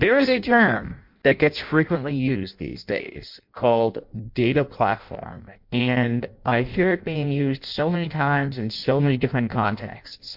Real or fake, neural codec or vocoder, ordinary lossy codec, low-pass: fake; codec, 16 kHz in and 24 kHz out, 0.6 kbps, FireRedTTS-2 codec; MP3, 48 kbps; 5.4 kHz